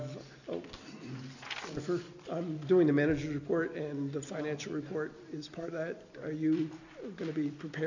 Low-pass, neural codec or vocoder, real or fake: 7.2 kHz; none; real